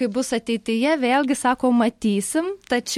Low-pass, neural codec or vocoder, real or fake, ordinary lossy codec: 19.8 kHz; none; real; MP3, 64 kbps